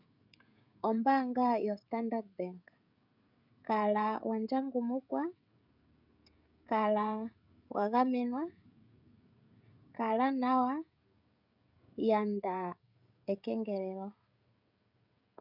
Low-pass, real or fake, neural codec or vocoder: 5.4 kHz; fake; codec, 16 kHz, 16 kbps, FreqCodec, smaller model